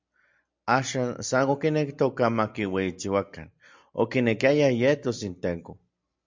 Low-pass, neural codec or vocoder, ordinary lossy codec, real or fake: 7.2 kHz; none; MP3, 64 kbps; real